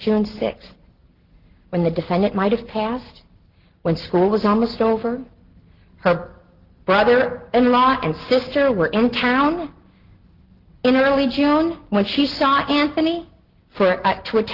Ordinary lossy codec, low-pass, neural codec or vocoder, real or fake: Opus, 16 kbps; 5.4 kHz; none; real